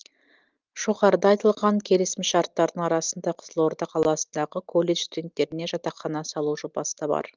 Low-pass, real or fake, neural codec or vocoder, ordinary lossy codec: 7.2 kHz; real; none; Opus, 32 kbps